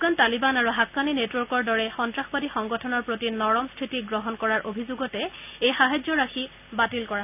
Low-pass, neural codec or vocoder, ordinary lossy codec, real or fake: 3.6 kHz; none; none; real